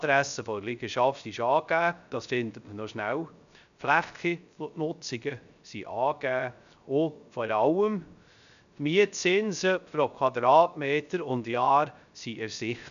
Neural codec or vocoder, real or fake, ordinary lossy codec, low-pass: codec, 16 kHz, 0.3 kbps, FocalCodec; fake; none; 7.2 kHz